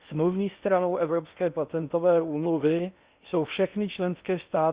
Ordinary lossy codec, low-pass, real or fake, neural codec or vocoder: Opus, 64 kbps; 3.6 kHz; fake; codec, 16 kHz in and 24 kHz out, 0.6 kbps, FocalCodec, streaming, 4096 codes